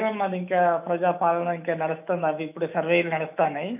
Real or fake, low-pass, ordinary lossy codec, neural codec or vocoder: fake; 3.6 kHz; none; autoencoder, 48 kHz, 128 numbers a frame, DAC-VAE, trained on Japanese speech